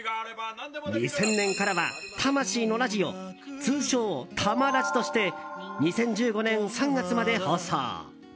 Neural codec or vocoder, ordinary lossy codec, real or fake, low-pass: none; none; real; none